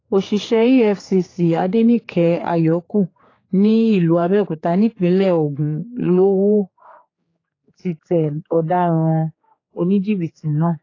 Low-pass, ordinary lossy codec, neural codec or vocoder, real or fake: 7.2 kHz; AAC, 32 kbps; codec, 16 kHz, 4 kbps, X-Codec, HuBERT features, trained on general audio; fake